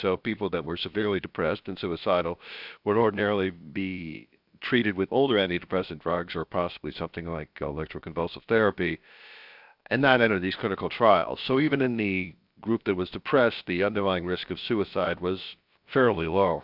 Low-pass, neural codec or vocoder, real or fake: 5.4 kHz; codec, 16 kHz, about 1 kbps, DyCAST, with the encoder's durations; fake